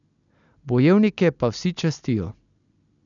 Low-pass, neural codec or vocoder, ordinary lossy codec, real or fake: 7.2 kHz; none; none; real